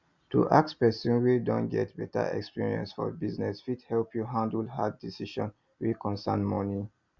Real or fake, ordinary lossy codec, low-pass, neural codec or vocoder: real; Opus, 64 kbps; 7.2 kHz; none